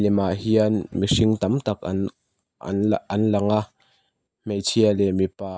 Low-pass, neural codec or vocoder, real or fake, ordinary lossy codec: none; none; real; none